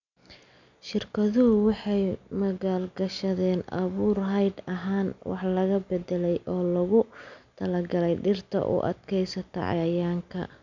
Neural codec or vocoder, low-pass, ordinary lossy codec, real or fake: none; 7.2 kHz; none; real